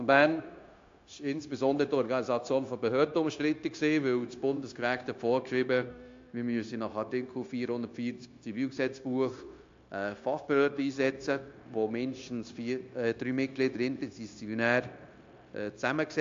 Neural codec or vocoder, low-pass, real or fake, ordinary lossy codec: codec, 16 kHz, 0.9 kbps, LongCat-Audio-Codec; 7.2 kHz; fake; AAC, 48 kbps